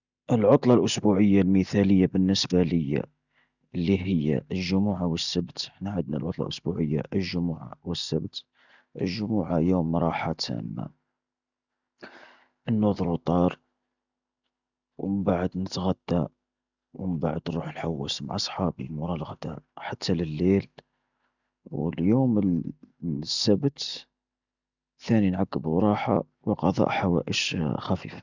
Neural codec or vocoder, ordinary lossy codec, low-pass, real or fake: none; none; 7.2 kHz; real